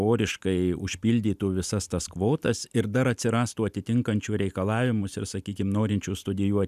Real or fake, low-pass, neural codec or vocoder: real; 14.4 kHz; none